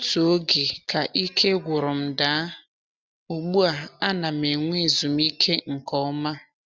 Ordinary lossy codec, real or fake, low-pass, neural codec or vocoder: none; real; none; none